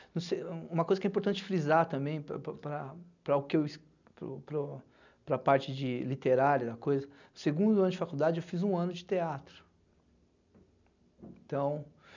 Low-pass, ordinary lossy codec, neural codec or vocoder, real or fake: 7.2 kHz; none; none; real